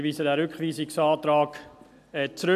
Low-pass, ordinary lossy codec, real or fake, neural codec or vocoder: 14.4 kHz; none; real; none